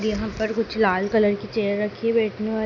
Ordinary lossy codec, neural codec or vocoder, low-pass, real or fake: none; none; 7.2 kHz; real